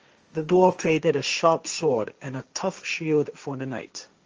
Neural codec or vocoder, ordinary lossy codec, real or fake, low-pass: codec, 16 kHz, 1.1 kbps, Voila-Tokenizer; Opus, 24 kbps; fake; 7.2 kHz